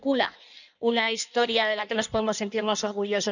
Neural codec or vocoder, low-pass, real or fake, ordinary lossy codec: codec, 16 kHz in and 24 kHz out, 1.1 kbps, FireRedTTS-2 codec; 7.2 kHz; fake; none